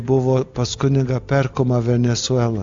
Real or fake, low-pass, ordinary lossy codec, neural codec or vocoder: real; 7.2 kHz; AAC, 64 kbps; none